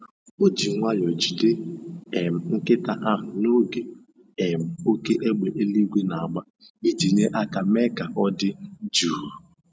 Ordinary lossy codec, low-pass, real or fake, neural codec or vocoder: none; none; real; none